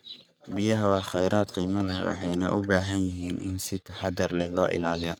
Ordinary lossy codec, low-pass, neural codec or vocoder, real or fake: none; none; codec, 44.1 kHz, 3.4 kbps, Pupu-Codec; fake